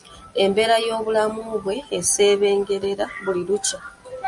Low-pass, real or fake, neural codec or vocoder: 10.8 kHz; real; none